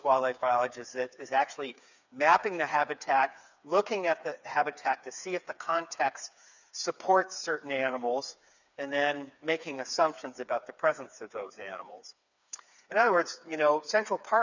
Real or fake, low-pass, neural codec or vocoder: fake; 7.2 kHz; codec, 16 kHz, 4 kbps, FreqCodec, smaller model